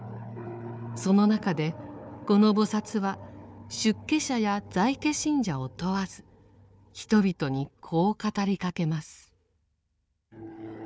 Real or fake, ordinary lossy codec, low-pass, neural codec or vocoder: fake; none; none; codec, 16 kHz, 4 kbps, FunCodec, trained on Chinese and English, 50 frames a second